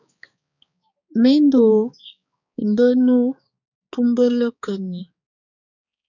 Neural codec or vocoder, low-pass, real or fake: codec, 16 kHz, 4 kbps, X-Codec, HuBERT features, trained on balanced general audio; 7.2 kHz; fake